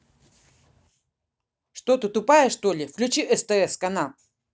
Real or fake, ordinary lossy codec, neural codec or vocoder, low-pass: real; none; none; none